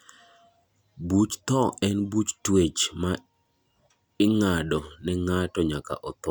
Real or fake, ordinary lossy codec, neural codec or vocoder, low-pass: real; none; none; none